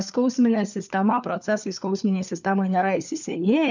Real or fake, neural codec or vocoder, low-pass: fake; codec, 16 kHz, 4 kbps, FunCodec, trained on LibriTTS, 50 frames a second; 7.2 kHz